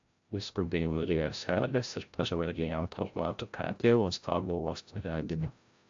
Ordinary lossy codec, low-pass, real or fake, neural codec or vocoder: AAC, 64 kbps; 7.2 kHz; fake; codec, 16 kHz, 0.5 kbps, FreqCodec, larger model